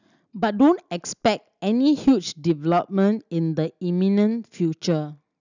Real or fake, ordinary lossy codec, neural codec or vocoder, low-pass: real; none; none; 7.2 kHz